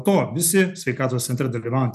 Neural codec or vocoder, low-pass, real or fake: none; 14.4 kHz; real